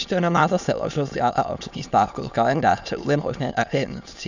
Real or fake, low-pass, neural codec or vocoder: fake; 7.2 kHz; autoencoder, 22.05 kHz, a latent of 192 numbers a frame, VITS, trained on many speakers